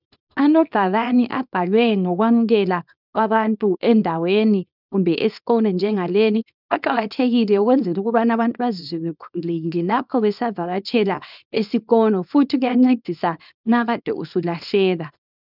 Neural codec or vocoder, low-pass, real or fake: codec, 24 kHz, 0.9 kbps, WavTokenizer, small release; 5.4 kHz; fake